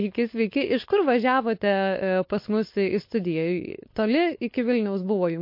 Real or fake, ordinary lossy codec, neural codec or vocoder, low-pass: fake; MP3, 32 kbps; codec, 16 kHz, 4.8 kbps, FACodec; 5.4 kHz